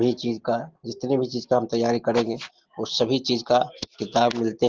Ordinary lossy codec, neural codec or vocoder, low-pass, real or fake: Opus, 16 kbps; none; 7.2 kHz; real